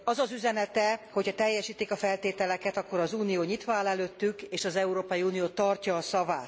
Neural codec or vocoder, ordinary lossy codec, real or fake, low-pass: none; none; real; none